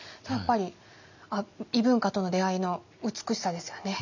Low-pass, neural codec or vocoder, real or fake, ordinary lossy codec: 7.2 kHz; none; real; none